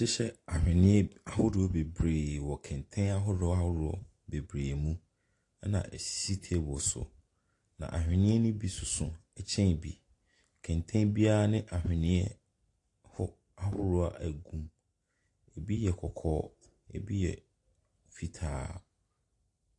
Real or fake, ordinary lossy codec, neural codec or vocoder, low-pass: real; AAC, 48 kbps; none; 10.8 kHz